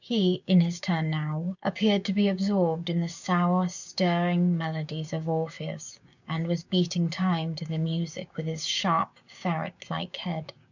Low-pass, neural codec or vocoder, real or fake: 7.2 kHz; codec, 16 kHz, 6 kbps, DAC; fake